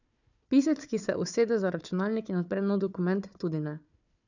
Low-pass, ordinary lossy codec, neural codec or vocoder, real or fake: 7.2 kHz; none; codec, 16 kHz, 4 kbps, FunCodec, trained on Chinese and English, 50 frames a second; fake